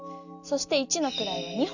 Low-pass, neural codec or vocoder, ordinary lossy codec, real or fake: 7.2 kHz; none; none; real